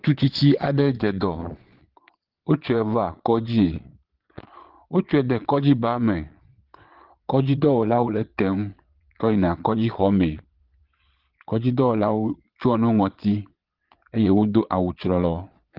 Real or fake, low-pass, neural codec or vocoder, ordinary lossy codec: fake; 5.4 kHz; vocoder, 22.05 kHz, 80 mel bands, Vocos; Opus, 16 kbps